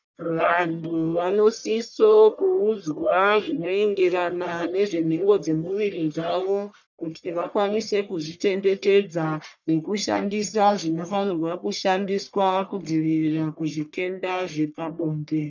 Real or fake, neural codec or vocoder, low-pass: fake; codec, 44.1 kHz, 1.7 kbps, Pupu-Codec; 7.2 kHz